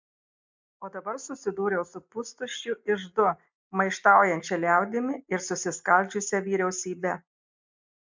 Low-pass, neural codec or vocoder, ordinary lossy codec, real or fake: 7.2 kHz; none; MP3, 64 kbps; real